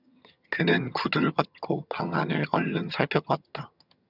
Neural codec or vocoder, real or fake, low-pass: vocoder, 22.05 kHz, 80 mel bands, HiFi-GAN; fake; 5.4 kHz